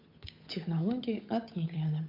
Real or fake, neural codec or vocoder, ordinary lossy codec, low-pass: fake; codec, 16 kHz, 8 kbps, FunCodec, trained on Chinese and English, 25 frames a second; MP3, 32 kbps; 5.4 kHz